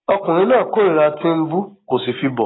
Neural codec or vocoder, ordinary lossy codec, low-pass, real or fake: none; AAC, 16 kbps; 7.2 kHz; real